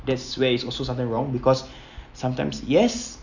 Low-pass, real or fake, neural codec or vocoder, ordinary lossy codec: 7.2 kHz; real; none; none